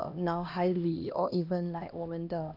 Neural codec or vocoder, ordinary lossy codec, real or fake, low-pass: codec, 16 kHz, 1 kbps, X-Codec, HuBERT features, trained on LibriSpeech; AAC, 48 kbps; fake; 5.4 kHz